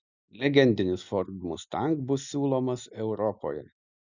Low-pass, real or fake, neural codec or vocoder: 7.2 kHz; real; none